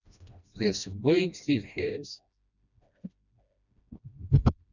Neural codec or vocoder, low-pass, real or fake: codec, 16 kHz, 1 kbps, FreqCodec, smaller model; 7.2 kHz; fake